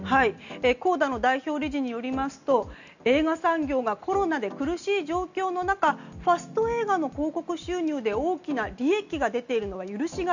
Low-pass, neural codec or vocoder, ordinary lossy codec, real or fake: 7.2 kHz; none; none; real